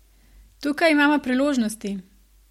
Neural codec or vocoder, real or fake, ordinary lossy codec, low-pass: none; real; MP3, 64 kbps; 19.8 kHz